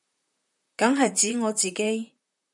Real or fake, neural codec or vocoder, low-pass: fake; vocoder, 44.1 kHz, 128 mel bands, Pupu-Vocoder; 10.8 kHz